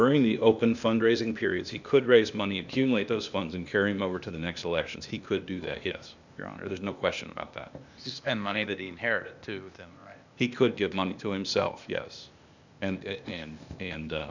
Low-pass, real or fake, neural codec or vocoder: 7.2 kHz; fake; codec, 16 kHz, 0.8 kbps, ZipCodec